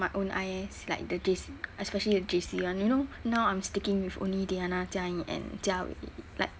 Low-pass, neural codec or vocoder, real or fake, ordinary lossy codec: none; none; real; none